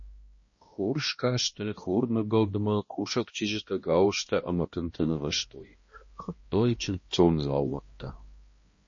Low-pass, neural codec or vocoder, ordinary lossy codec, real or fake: 7.2 kHz; codec, 16 kHz, 1 kbps, X-Codec, HuBERT features, trained on balanced general audio; MP3, 32 kbps; fake